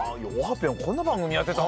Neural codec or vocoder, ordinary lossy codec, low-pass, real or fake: none; none; none; real